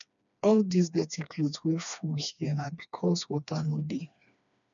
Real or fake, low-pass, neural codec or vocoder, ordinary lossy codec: fake; 7.2 kHz; codec, 16 kHz, 2 kbps, FreqCodec, smaller model; none